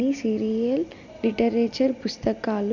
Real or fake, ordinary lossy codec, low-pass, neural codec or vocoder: real; none; 7.2 kHz; none